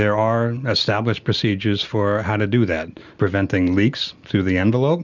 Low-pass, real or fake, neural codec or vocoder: 7.2 kHz; real; none